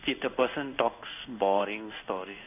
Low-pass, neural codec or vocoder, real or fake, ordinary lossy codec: 3.6 kHz; codec, 16 kHz in and 24 kHz out, 1 kbps, XY-Tokenizer; fake; none